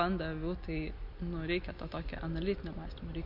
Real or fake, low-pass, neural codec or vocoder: real; 5.4 kHz; none